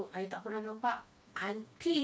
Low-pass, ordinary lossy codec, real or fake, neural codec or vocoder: none; none; fake; codec, 16 kHz, 2 kbps, FreqCodec, smaller model